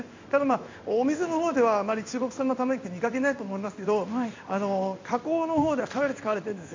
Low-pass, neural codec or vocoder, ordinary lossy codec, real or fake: 7.2 kHz; codec, 16 kHz in and 24 kHz out, 1 kbps, XY-Tokenizer; MP3, 64 kbps; fake